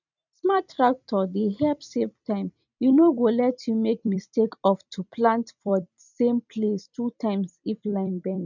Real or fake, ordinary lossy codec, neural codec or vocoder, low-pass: fake; none; vocoder, 44.1 kHz, 128 mel bands every 256 samples, BigVGAN v2; 7.2 kHz